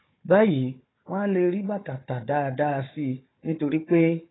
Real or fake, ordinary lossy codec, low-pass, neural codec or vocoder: fake; AAC, 16 kbps; 7.2 kHz; codec, 16 kHz, 4 kbps, FunCodec, trained on Chinese and English, 50 frames a second